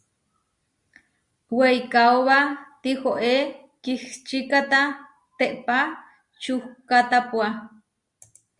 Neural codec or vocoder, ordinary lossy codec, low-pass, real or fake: none; Opus, 64 kbps; 10.8 kHz; real